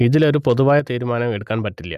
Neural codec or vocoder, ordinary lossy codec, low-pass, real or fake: none; none; 14.4 kHz; real